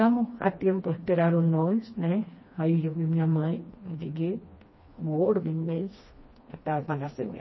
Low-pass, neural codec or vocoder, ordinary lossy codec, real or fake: 7.2 kHz; codec, 16 kHz, 2 kbps, FreqCodec, smaller model; MP3, 24 kbps; fake